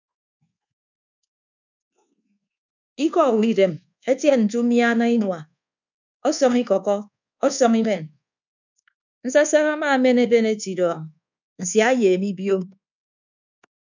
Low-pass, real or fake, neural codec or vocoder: 7.2 kHz; fake; codec, 24 kHz, 1.2 kbps, DualCodec